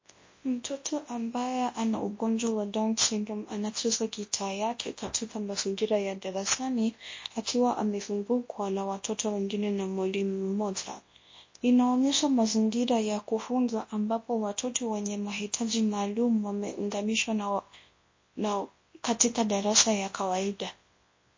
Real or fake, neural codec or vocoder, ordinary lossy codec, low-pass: fake; codec, 24 kHz, 0.9 kbps, WavTokenizer, large speech release; MP3, 32 kbps; 7.2 kHz